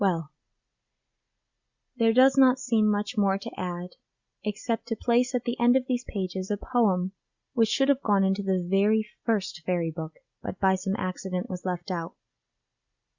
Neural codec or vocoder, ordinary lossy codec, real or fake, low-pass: none; Opus, 64 kbps; real; 7.2 kHz